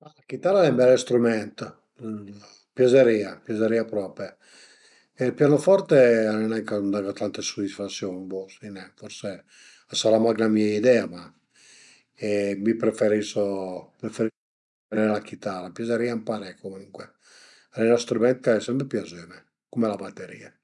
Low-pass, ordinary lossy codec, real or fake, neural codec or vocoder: 10.8 kHz; none; real; none